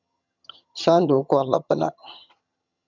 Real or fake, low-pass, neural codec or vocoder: fake; 7.2 kHz; vocoder, 22.05 kHz, 80 mel bands, HiFi-GAN